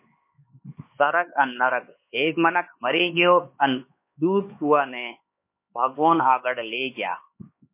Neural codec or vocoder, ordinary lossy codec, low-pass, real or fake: codec, 16 kHz, 4 kbps, X-Codec, HuBERT features, trained on LibriSpeech; MP3, 24 kbps; 3.6 kHz; fake